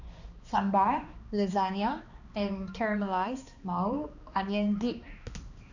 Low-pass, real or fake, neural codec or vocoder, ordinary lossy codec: 7.2 kHz; fake; codec, 16 kHz, 2 kbps, X-Codec, HuBERT features, trained on balanced general audio; none